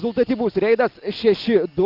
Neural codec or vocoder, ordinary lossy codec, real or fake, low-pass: none; Opus, 24 kbps; real; 5.4 kHz